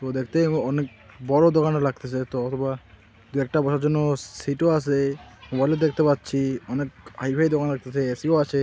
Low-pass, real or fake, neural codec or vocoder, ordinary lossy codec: none; real; none; none